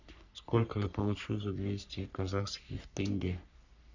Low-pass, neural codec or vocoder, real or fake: 7.2 kHz; codec, 44.1 kHz, 3.4 kbps, Pupu-Codec; fake